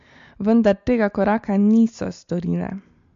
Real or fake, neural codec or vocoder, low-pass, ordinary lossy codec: real; none; 7.2 kHz; MP3, 48 kbps